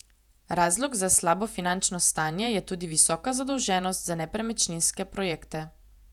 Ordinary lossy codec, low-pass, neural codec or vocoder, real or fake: none; 19.8 kHz; vocoder, 48 kHz, 128 mel bands, Vocos; fake